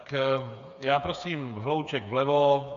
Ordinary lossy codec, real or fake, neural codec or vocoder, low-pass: AAC, 96 kbps; fake; codec, 16 kHz, 4 kbps, FreqCodec, smaller model; 7.2 kHz